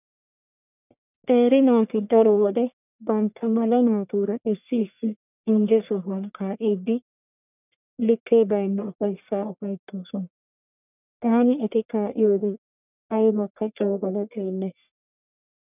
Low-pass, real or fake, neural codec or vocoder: 3.6 kHz; fake; codec, 44.1 kHz, 1.7 kbps, Pupu-Codec